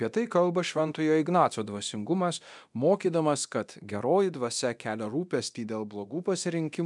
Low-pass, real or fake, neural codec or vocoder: 10.8 kHz; fake; codec, 24 kHz, 0.9 kbps, DualCodec